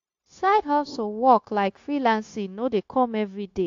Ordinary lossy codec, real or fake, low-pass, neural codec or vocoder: AAC, 48 kbps; fake; 7.2 kHz; codec, 16 kHz, 0.9 kbps, LongCat-Audio-Codec